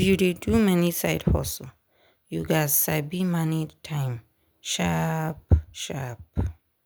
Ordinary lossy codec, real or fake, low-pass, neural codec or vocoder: none; real; none; none